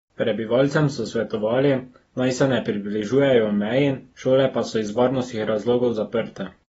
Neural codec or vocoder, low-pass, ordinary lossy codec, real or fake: none; 10.8 kHz; AAC, 24 kbps; real